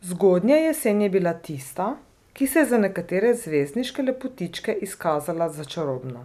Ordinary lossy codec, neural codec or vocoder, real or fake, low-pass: none; none; real; 14.4 kHz